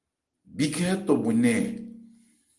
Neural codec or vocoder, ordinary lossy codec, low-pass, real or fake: none; Opus, 24 kbps; 10.8 kHz; real